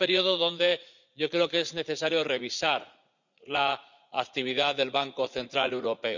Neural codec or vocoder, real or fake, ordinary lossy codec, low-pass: vocoder, 44.1 kHz, 80 mel bands, Vocos; fake; none; 7.2 kHz